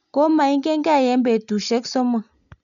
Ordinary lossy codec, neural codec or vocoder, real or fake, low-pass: none; none; real; 7.2 kHz